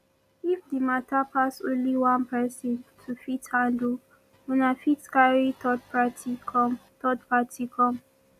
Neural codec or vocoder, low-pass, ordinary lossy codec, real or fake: none; 14.4 kHz; none; real